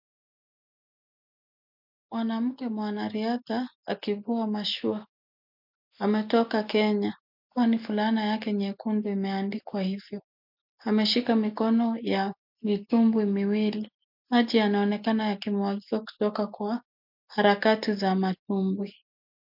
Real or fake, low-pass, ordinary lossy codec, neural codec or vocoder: real; 5.4 kHz; MP3, 48 kbps; none